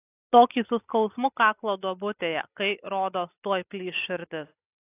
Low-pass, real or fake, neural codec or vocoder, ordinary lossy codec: 3.6 kHz; real; none; AAC, 32 kbps